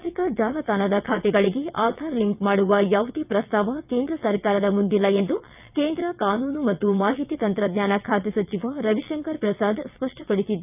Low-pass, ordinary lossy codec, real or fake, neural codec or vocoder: 3.6 kHz; none; fake; vocoder, 22.05 kHz, 80 mel bands, WaveNeXt